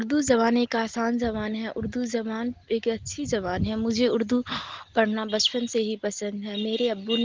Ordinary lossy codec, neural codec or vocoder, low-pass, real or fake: Opus, 16 kbps; none; 7.2 kHz; real